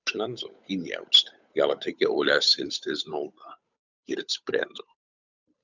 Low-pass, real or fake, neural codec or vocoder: 7.2 kHz; fake; codec, 16 kHz, 8 kbps, FunCodec, trained on Chinese and English, 25 frames a second